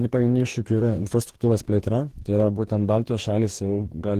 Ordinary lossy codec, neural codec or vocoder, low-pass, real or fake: Opus, 16 kbps; codec, 44.1 kHz, 2.6 kbps, DAC; 14.4 kHz; fake